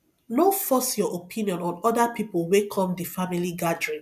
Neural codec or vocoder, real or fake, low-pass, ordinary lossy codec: none; real; 14.4 kHz; none